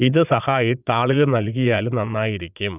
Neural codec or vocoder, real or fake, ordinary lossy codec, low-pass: vocoder, 22.05 kHz, 80 mel bands, Vocos; fake; none; 3.6 kHz